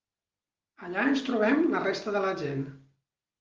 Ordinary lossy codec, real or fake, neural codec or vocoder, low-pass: Opus, 24 kbps; real; none; 7.2 kHz